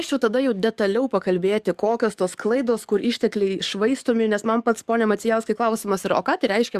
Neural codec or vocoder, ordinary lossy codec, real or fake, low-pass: codec, 44.1 kHz, 7.8 kbps, DAC; Opus, 64 kbps; fake; 14.4 kHz